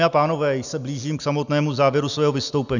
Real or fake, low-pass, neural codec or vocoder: real; 7.2 kHz; none